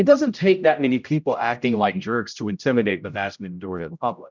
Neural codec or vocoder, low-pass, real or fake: codec, 16 kHz, 0.5 kbps, X-Codec, HuBERT features, trained on general audio; 7.2 kHz; fake